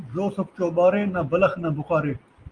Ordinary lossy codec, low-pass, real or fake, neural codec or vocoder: Opus, 32 kbps; 9.9 kHz; real; none